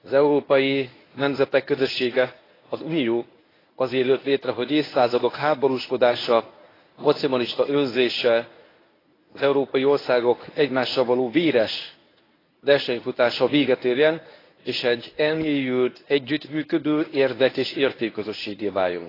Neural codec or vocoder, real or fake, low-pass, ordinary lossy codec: codec, 24 kHz, 0.9 kbps, WavTokenizer, medium speech release version 1; fake; 5.4 kHz; AAC, 24 kbps